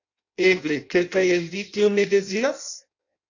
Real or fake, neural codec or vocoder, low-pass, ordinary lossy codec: fake; codec, 16 kHz in and 24 kHz out, 0.6 kbps, FireRedTTS-2 codec; 7.2 kHz; AAC, 48 kbps